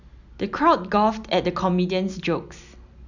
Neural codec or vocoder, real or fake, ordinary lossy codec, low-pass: none; real; none; 7.2 kHz